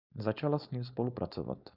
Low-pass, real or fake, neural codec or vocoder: 5.4 kHz; fake; codec, 16 kHz, 4.8 kbps, FACodec